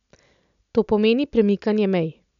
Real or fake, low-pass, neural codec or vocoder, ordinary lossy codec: real; 7.2 kHz; none; none